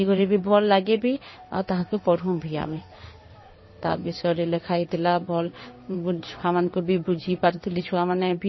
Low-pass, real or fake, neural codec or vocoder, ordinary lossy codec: 7.2 kHz; fake; codec, 16 kHz in and 24 kHz out, 1 kbps, XY-Tokenizer; MP3, 24 kbps